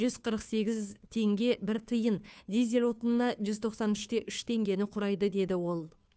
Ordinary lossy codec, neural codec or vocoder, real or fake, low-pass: none; codec, 16 kHz, 2 kbps, FunCodec, trained on Chinese and English, 25 frames a second; fake; none